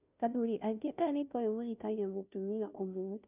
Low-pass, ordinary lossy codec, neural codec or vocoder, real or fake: 3.6 kHz; none; codec, 16 kHz, 0.5 kbps, FunCodec, trained on Chinese and English, 25 frames a second; fake